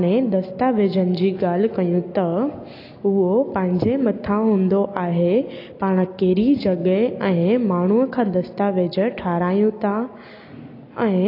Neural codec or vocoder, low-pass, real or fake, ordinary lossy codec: none; 5.4 kHz; real; AAC, 32 kbps